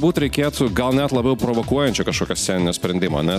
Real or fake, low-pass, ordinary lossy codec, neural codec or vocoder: real; 14.4 kHz; MP3, 96 kbps; none